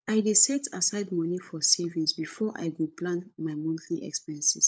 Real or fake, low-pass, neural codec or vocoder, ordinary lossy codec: fake; none; codec, 16 kHz, 16 kbps, FunCodec, trained on LibriTTS, 50 frames a second; none